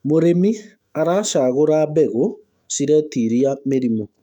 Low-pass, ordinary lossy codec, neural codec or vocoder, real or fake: 19.8 kHz; none; autoencoder, 48 kHz, 128 numbers a frame, DAC-VAE, trained on Japanese speech; fake